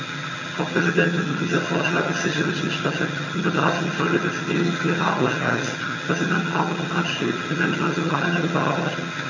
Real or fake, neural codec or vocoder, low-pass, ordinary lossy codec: fake; vocoder, 22.05 kHz, 80 mel bands, HiFi-GAN; 7.2 kHz; none